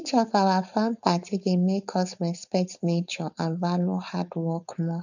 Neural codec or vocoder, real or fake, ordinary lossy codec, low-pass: codec, 16 kHz, 4.8 kbps, FACodec; fake; none; 7.2 kHz